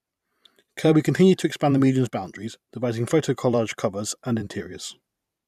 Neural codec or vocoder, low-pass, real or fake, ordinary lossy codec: vocoder, 44.1 kHz, 128 mel bands every 512 samples, BigVGAN v2; 14.4 kHz; fake; none